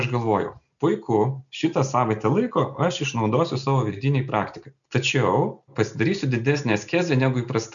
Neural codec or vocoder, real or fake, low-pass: none; real; 7.2 kHz